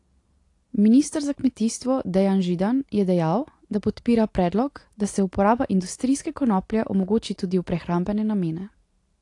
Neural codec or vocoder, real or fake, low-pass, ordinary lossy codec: none; real; 10.8 kHz; AAC, 48 kbps